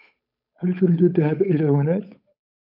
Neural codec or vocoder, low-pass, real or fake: codec, 16 kHz, 8 kbps, FunCodec, trained on Chinese and English, 25 frames a second; 5.4 kHz; fake